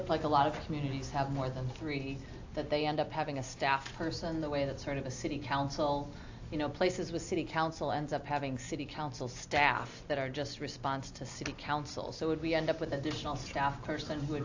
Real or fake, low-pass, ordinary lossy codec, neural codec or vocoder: real; 7.2 kHz; AAC, 48 kbps; none